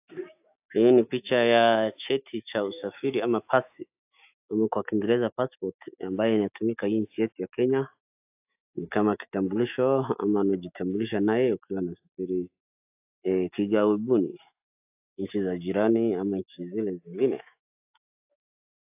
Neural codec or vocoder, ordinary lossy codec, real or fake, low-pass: autoencoder, 48 kHz, 128 numbers a frame, DAC-VAE, trained on Japanese speech; AAC, 32 kbps; fake; 3.6 kHz